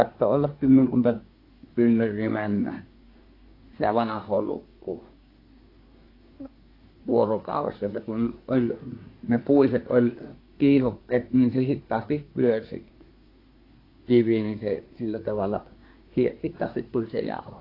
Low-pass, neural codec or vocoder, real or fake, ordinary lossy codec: 5.4 kHz; codec, 24 kHz, 1 kbps, SNAC; fake; AAC, 32 kbps